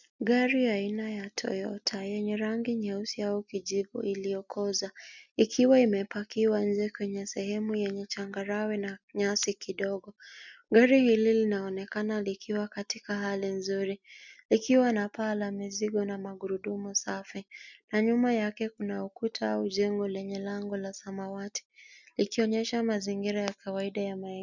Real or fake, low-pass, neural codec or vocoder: real; 7.2 kHz; none